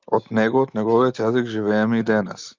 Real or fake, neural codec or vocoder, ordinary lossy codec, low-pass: real; none; Opus, 24 kbps; 7.2 kHz